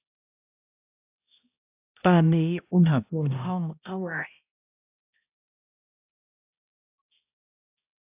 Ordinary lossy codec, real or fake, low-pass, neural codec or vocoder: AAC, 32 kbps; fake; 3.6 kHz; codec, 16 kHz, 0.5 kbps, X-Codec, HuBERT features, trained on balanced general audio